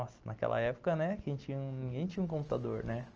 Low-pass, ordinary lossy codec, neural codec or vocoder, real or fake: 7.2 kHz; Opus, 24 kbps; none; real